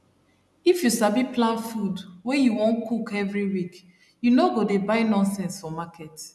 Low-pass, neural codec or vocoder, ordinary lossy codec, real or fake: none; none; none; real